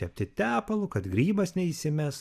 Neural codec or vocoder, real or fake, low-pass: vocoder, 44.1 kHz, 128 mel bands every 512 samples, BigVGAN v2; fake; 14.4 kHz